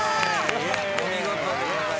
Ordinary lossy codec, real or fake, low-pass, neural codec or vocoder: none; real; none; none